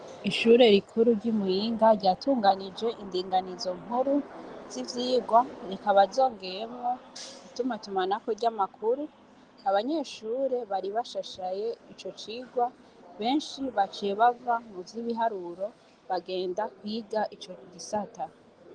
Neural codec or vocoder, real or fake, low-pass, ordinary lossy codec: none; real; 9.9 kHz; Opus, 24 kbps